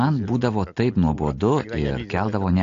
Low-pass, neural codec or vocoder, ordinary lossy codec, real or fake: 7.2 kHz; none; AAC, 48 kbps; real